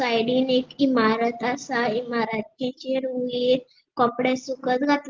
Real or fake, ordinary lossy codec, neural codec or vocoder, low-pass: real; Opus, 16 kbps; none; 7.2 kHz